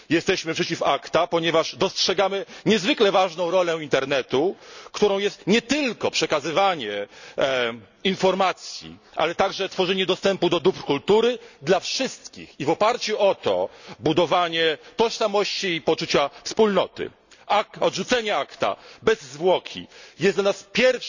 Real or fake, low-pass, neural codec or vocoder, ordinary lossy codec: real; 7.2 kHz; none; none